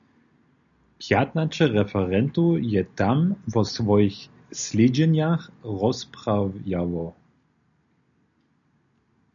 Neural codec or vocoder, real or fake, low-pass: none; real; 7.2 kHz